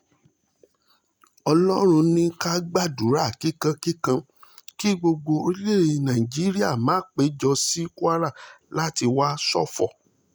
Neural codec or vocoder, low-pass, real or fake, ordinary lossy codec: none; none; real; none